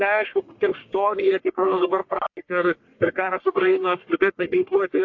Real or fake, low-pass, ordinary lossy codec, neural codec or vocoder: fake; 7.2 kHz; AAC, 48 kbps; codec, 44.1 kHz, 1.7 kbps, Pupu-Codec